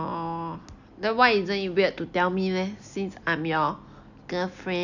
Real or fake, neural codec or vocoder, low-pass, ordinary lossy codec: real; none; 7.2 kHz; none